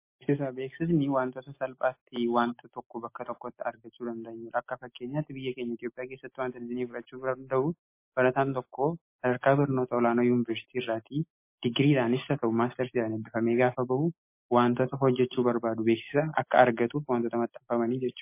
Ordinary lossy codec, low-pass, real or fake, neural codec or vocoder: MP3, 24 kbps; 3.6 kHz; real; none